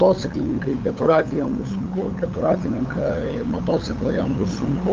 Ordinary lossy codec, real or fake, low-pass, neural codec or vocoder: Opus, 32 kbps; fake; 7.2 kHz; codec, 16 kHz, 8 kbps, FunCodec, trained on LibriTTS, 25 frames a second